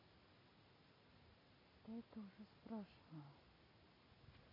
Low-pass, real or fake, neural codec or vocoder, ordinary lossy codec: 5.4 kHz; real; none; MP3, 24 kbps